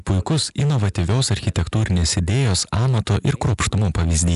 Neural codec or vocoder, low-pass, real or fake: none; 10.8 kHz; real